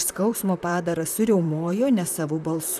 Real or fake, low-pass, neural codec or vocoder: fake; 14.4 kHz; vocoder, 44.1 kHz, 128 mel bands, Pupu-Vocoder